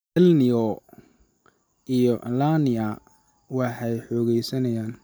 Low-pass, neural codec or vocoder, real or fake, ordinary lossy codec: none; none; real; none